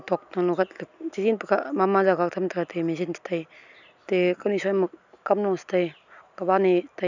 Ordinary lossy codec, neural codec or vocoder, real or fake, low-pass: none; none; real; 7.2 kHz